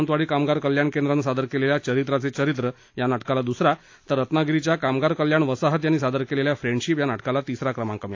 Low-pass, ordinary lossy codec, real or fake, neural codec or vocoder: 7.2 kHz; AAC, 48 kbps; real; none